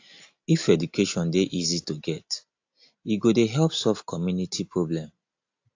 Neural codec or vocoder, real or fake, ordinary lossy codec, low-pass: none; real; AAC, 48 kbps; 7.2 kHz